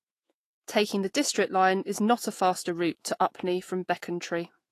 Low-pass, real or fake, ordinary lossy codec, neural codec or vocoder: 14.4 kHz; fake; AAC, 48 kbps; autoencoder, 48 kHz, 128 numbers a frame, DAC-VAE, trained on Japanese speech